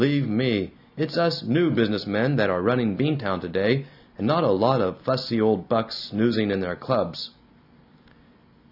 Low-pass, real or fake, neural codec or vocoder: 5.4 kHz; real; none